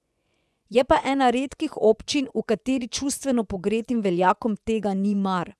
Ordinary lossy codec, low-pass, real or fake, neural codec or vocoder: none; none; fake; vocoder, 24 kHz, 100 mel bands, Vocos